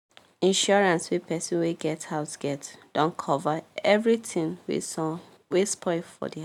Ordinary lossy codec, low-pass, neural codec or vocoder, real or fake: none; 19.8 kHz; none; real